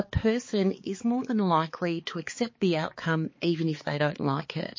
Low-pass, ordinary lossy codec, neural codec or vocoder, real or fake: 7.2 kHz; MP3, 32 kbps; codec, 16 kHz, 4 kbps, X-Codec, HuBERT features, trained on balanced general audio; fake